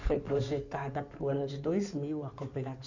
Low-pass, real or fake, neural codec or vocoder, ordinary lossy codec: 7.2 kHz; fake; codec, 16 kHz in and 24 kHz out, 2.2 kbps, FireRedTTS-2 codec; none